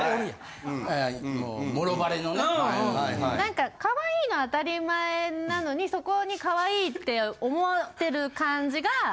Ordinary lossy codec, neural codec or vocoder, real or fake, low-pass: none; none; real; none